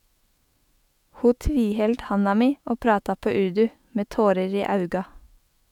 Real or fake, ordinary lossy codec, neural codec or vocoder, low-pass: fake; MP3, 96 kbps; autoencoder, 48 kHz, 128 numbers a frame, DAC-VAE, trained on Japanese speech; 19.8 kHz